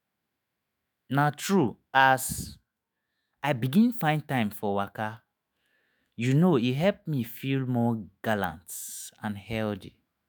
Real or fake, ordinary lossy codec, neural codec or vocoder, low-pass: fake; none; autoencoder, 48 kHz, 128 numbers a frame, DAC-VAE, trained on Japanese speech; none